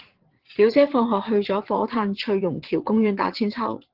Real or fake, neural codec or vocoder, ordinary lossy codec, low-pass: fake; vocoder, 22.05 kHz, 80 mel bands, WaveNeXt; Opus, 16 kbps; 5.4 kHz